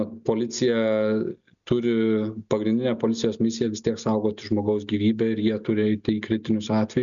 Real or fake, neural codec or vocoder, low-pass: real; none; 7.2 kHz